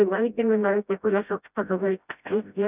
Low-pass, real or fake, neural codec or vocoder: 3.6 kHz; fake; codec, 16 kHz, 0.5 kbps, FreqCodec, smaller model